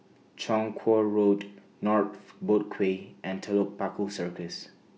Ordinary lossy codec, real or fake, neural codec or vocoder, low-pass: none; real; none; none